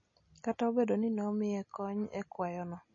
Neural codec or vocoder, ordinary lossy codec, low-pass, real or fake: none; MP3, 32 kbps; 7.2 kHz; real